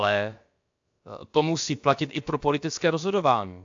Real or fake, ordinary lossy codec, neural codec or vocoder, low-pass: fake; AAC, 64 kbps; codec, 16 kHz, about 1 kbps, DyCAST, with the encoder's durations; 7.2 kHz